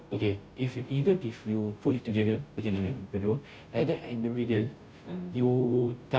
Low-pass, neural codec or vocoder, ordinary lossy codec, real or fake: none; codec, 16 kHz, 0.5 kbps, FunCodec, trained on Chinese and English, 25 frames a second; none; fake